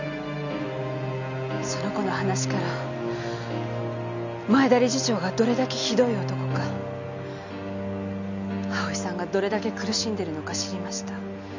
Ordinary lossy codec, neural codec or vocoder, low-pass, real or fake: none; none; 7.2 kHz; real